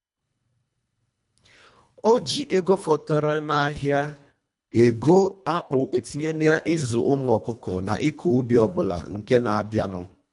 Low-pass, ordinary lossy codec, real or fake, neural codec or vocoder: 10.8 kHz; none; fake; codec, 24 kHz, 1.5 kbps, HILCodec